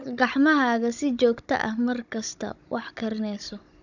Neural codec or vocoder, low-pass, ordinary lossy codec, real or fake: codec, 16 kHz, 16 kbps, FunCodec, trained on Chinese and English, 50 frames a second; 7.2 kHz; none; fake